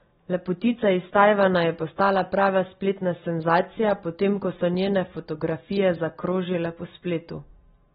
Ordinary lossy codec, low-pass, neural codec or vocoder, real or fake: AAC, 16 kbps; 10.8 kHz; none; real